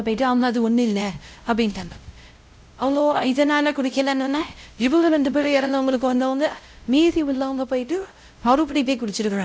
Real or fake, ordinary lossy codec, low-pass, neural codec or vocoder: fake; none; none; codec, 16 kHz, 0.5 kbps, X-Codec, WavLM features, trained on Multilingual LibriSpeech